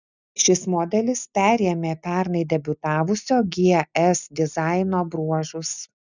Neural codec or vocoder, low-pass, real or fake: none; 7.2 kHz; real